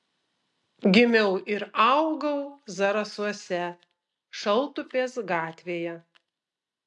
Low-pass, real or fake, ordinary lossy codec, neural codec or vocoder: 10.8 kHz; real; AAC, 64 kbps; none